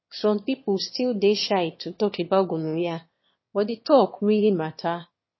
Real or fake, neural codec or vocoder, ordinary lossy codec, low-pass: fake; autoencoder, 22.05 kHz, a latent of 192 numbers a frame, VITS, trained on one speaker; MP3, 24 kbps; 7.2 kHz